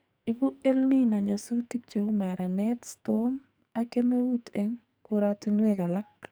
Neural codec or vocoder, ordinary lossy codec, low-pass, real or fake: codec, 44.1 kHz, 2.6 kbps, SNAC; none; none; fake